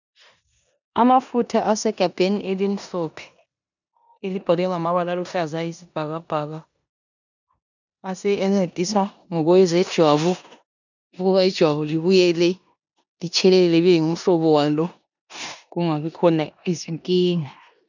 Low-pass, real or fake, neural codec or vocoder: 7.2 kHz; fake; codec, 16 kHz in and 24 kHz out, 0.9 kbps, LongCat-Audio-Codec, four codebook decoder